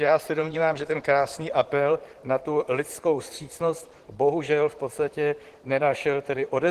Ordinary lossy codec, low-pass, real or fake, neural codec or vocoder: Opus, 16 kbps; 14.4 kHz; fake; vocoder, 44.1 kHz, 128 mel bands, Pupu-Vocoder